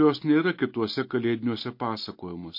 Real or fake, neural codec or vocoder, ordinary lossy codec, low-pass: real; none; MP3, 32 kbps; 5.4 kHz